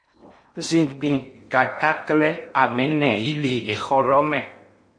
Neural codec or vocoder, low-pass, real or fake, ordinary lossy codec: codec, 16 kHz in and 24 kHz out, 0.8 kbps, FocalCodec, streaming, 65536 codes; 9.9 kHz; fake; MP3, 48 kbps